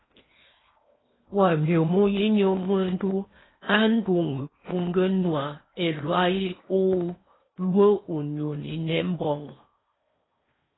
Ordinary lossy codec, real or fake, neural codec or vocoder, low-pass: AAC, 16 kbps; fake; codec, 16 kHz in and 24 kHz out, 0.8 kbps, FocalCodec, streaming, 65536 codes; 7.2 kHz